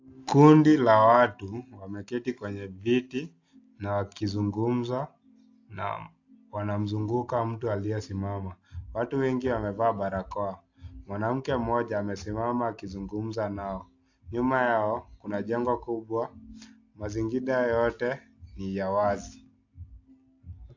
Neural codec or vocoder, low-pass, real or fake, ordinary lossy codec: none; 7.2 kHz; real; AAC, 48 kbps